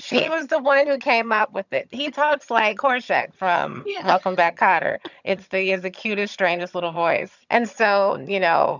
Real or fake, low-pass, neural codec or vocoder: fake; 7.2 kHz; vocoder, 22.05 kHz, 80 mel bands, HiFi-GAN